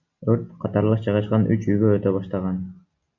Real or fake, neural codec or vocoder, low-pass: real; none; 7.2 kHz